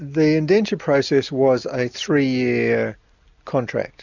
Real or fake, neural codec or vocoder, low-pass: real; none; 7.2 kHz